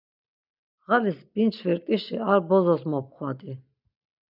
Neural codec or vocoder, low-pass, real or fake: none; 5.4 kHz; real